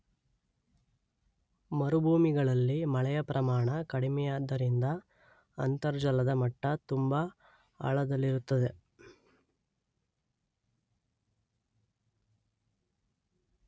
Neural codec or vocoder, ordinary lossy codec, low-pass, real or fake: none; none; none; real